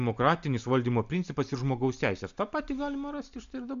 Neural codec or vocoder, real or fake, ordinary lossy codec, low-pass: none; real; MP3, 64 kbps; 7.2 kHz